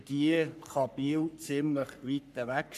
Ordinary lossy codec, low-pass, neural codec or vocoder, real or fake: none; 14.4 kHz; codec, 44.1 kHz, 3.4 kbps, Pupu-Codec; fake